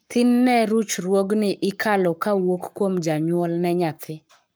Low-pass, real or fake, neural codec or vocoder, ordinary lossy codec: none; fake; codec, 44.1 kHz, 7.8 kbps, Pupu-Codec; none